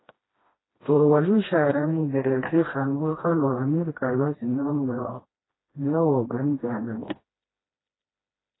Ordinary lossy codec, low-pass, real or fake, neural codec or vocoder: AAC, 16 kbps; 7.2 kHz; fake; codec, 16 kHz, 1 kbps, FreqCodec, smaller model